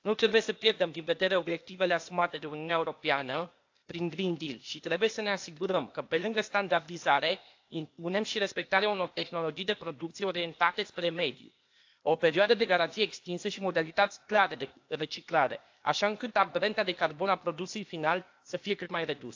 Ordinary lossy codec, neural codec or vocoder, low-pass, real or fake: AAC, 48 kbps; codec, 16 kHz, 0.8 kbps, ZipCodec; 7.2 kHz; fake